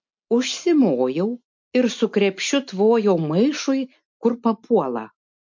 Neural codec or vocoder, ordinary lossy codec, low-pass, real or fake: none; MP3, 48 kbps; 7.2 kHz; real